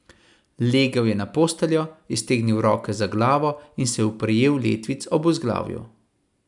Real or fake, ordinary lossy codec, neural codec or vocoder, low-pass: real; none; none; 10.8 kHz